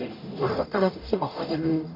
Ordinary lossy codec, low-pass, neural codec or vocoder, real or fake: MP3, 32 kbps; 5.4 kHz; codec, 44.1 kHz, 0.9 kbps, DAC; fake